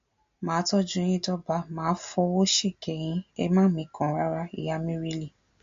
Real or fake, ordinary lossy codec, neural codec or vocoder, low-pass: real; MP3, 48 kbps; none; 7.2 kHz